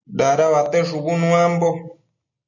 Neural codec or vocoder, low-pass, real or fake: none; 7.2 kHz; real